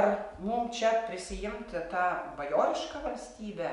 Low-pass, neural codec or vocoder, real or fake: 10.8 kHz; none; real